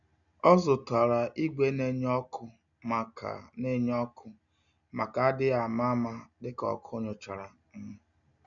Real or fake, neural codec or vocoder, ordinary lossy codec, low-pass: real; none; none; 7.2 kHz